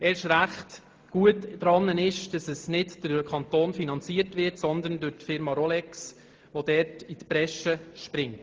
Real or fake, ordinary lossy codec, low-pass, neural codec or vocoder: real; Opus, 16 kbps; 7.2 kHz; none